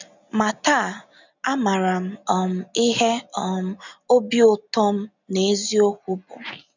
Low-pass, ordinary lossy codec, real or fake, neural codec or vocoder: 7.2 kHz; none; real; none